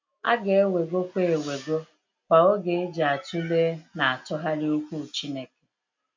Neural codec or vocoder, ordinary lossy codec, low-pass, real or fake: none; MP3, 64 kbps; 7.2 kHz; real